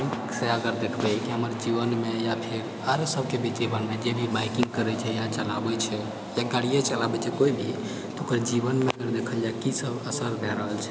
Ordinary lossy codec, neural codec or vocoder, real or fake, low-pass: none; none; real; none